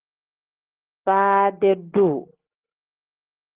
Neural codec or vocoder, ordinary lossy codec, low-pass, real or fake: none; Opus, 16 kbps; 3.6 kHz; real